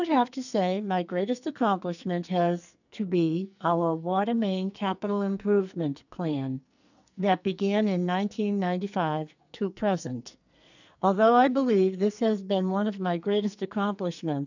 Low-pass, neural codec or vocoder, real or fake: 7.2 kHz; codec, 44.1 kHz, 2.6 kbps, SNAC; fake